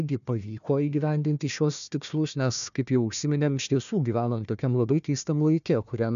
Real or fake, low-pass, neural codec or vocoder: fake; 7.2 kHz; codec, 16 kHz, 1 kbps, FunCodec, trained on Chinese and English, 50 frames a second